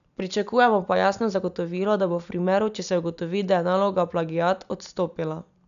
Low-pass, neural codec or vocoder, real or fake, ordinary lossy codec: 7.2 kHz; none; real; none